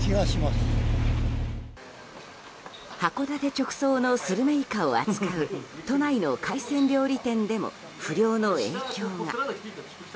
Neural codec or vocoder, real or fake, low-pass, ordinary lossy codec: none; real; none; none